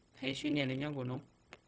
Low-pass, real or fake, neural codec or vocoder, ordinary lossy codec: none; fake; codec, 16 kHz, 0.4 kbps, LongCat-Audio-Codec; none